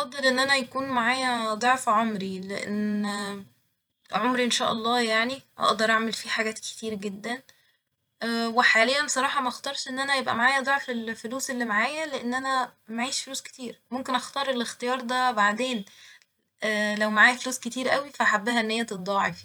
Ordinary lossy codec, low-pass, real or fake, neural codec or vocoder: none; none; fake; vocoder, 44.1 kHz, 128 mel bands every 512 samples, BigVGAN v2